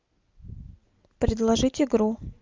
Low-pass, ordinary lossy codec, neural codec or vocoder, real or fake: 7.2 kHz; Opus, 24 kbps; none; real